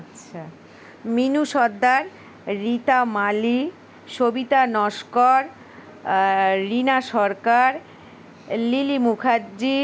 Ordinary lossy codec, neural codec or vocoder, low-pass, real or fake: none; none; none; real